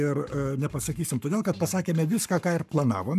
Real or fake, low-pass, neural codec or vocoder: fake; 14.4 kHz; codec, 44.1 kHz, 7.8 kbps, Pupu-Codec